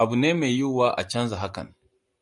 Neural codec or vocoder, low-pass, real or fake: vocoder, 44.1 kHz, 128 mel bands every 256 samples, BigVGAN v2; 10.8 kHz; fake